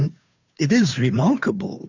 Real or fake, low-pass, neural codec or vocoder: fake; 7.2 kHz; codec, 16 kHz, 2 kbps, FunCodec, trained on Chinese and English, 25 frames a second